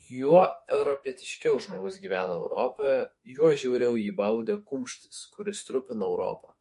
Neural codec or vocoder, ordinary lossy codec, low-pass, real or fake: autoencoder, 48 kHz, 32 numbers a frame, DAC-VAE, trained on Japanese speech; MP3, 48 kbps; 14.4 kHz; fake